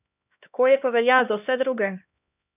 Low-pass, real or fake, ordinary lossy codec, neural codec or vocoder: 3.6 kHz; fake; none; codec, 16 kHz, 1 kbps, X-Codec, HuBERT features, trained on LibriSpeech